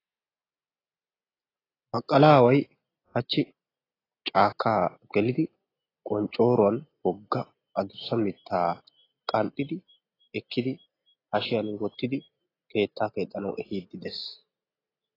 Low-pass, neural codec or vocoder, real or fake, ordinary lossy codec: 5.4 kHz; none; real; AAC, 24 kbps